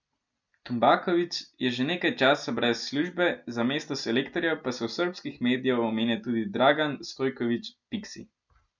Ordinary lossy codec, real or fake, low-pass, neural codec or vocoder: none; real; 7.2 kHz; none